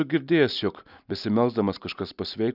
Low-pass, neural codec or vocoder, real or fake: 5.4 kHz; none; real